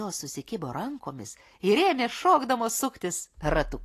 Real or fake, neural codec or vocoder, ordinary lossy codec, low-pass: real; none; AAC, 48 kbps; 14.4 kHz